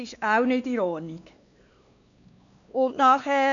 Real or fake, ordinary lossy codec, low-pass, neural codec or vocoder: fake; none; 7.2 kHz; codec, 16 kHz, 2 kbps, X-Codec, WavLM features, trained on Multilingual LibriSpeech